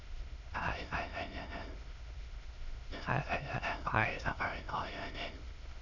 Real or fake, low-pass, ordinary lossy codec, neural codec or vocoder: fake; 7.2 kHz; none; autoencoder, 22.05 kHz, a latent of 192 numbers a frame, VITS, trained on many speakers